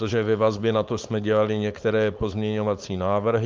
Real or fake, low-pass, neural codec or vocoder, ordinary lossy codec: fake; 7.2 kHz; codec, 16 kHz, 4.8 kbps, FACodec; Opus, 24 kbps